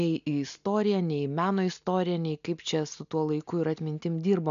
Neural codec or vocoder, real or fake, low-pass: none; real; 7.2 kHz